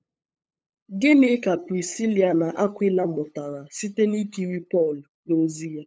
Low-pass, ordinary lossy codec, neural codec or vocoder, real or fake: none; none; codec, 16 kHz, 8 kbps, FunCodec, trained on LibriTTS, 25 frames a second; fake